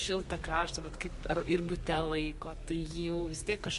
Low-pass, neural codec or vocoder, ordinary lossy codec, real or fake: 14.4 kHz; codec, 32 kHz, 1.9 kbps, SNAC; MP3, 48 kbps; fake